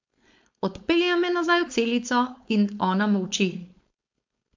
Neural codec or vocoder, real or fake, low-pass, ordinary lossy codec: codec, 16 kHz, 4.8 kbps, FACodec; fake; 7.2 kHz; MP3, 64 kbps